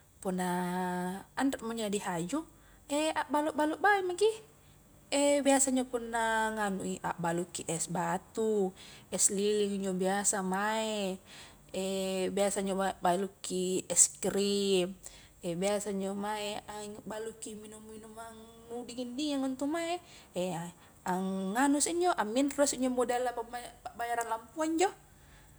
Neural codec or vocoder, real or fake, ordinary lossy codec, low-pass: none; real; none; none